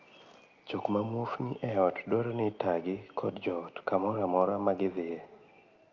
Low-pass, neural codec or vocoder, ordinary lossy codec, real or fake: 7.2 kHz; none; Opus, 32 kbps; real